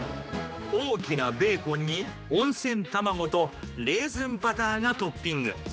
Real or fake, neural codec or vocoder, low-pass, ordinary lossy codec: fake; codec, 16 kHz, 2 kbps, X-Codec, HuBERT features, trained on general audio; none; none